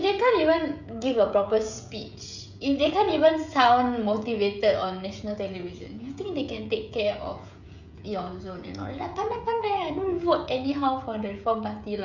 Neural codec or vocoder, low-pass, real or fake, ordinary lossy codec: codec, 16 kHz, 16 kbps, FreqCodec, smaller model; 7.2 kHz; fake; none